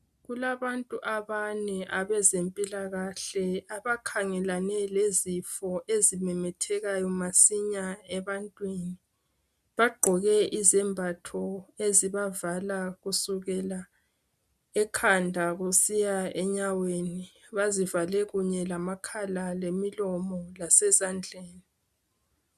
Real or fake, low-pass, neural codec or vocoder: real; 14.4 kHz; none